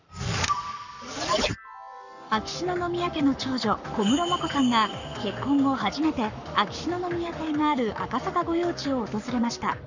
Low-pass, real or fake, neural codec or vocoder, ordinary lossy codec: 7.2 kHz; fake; codec, 44.1 kHz, 7.8 kbps, Pupu-Codec; none